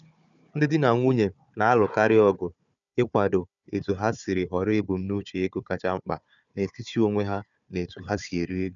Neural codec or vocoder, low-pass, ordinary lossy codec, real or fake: codec, 16 kHz, 4 kbps, FunCodec, trained on Chinese and English, 50 frames a second; 7.2 kHz; none; fake